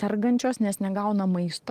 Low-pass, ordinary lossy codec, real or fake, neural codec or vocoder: 14.4 kHz; Opus, 24 kbps; real; none